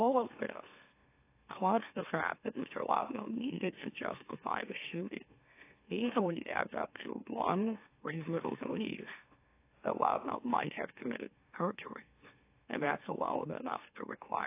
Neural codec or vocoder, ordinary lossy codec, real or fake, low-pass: autoencoder, 44.1 kHz, a latent of 192 numbers a frame, MeloTTS; AAC, 24 kbps; fake; 3.6 kHz